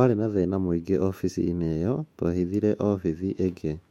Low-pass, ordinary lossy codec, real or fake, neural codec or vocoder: 19.8 kHz; MP3, 64 kbps; fake; autoencoder, 48 kHz, 128 numbers a frame, DAC-VAE, trained on Japanese speech